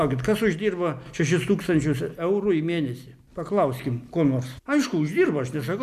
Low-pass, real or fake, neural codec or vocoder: 14.4 kHz; real; none